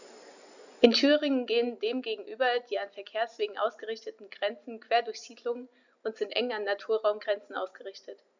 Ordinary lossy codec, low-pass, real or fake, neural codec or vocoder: none; 7.2 kHz; real; none